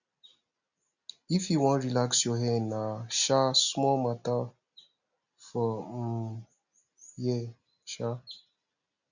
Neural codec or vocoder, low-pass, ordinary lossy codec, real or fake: none; 7.2 kHz; none; real